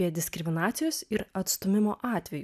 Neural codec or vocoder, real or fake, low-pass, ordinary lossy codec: none; real; 14.4 kHz; AAC, 96 kbps